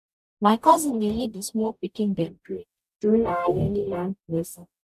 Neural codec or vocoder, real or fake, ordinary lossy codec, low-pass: codec, 44.1 kHz, 0.9 kbps, DAC; fake; none; 14.4 kHz